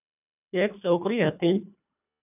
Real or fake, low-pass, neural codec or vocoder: fake; 3.6 kHz; codec, 24 kHz, 1.5 kbps, HILCodec